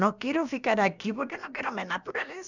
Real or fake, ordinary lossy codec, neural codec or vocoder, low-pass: fake; none; codec, 16 kHz, about 1 kbps, DyCAST, with the encoder's durations; 7.2 kHz